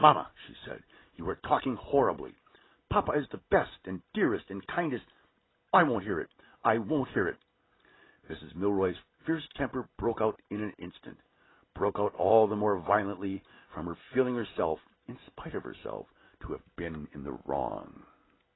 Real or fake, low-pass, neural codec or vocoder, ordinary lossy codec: real; 7.2 kHz; none; AAC, 16 kbps